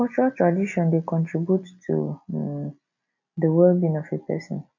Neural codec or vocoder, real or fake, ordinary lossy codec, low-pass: none; real; none; 7.2 kHz